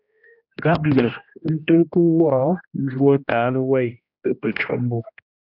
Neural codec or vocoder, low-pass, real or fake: codec, 16 kHz, 1 kbps, X-Codec, HuBERT features, trained on general audio; 5.4 kHz; fake